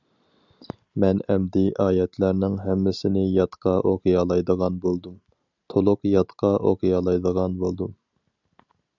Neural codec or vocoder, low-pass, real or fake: none; 7.2 kHz; real